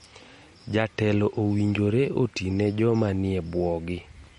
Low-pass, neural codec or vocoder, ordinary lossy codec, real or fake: 19.8 kHz; none; MP3, 48 kbps; real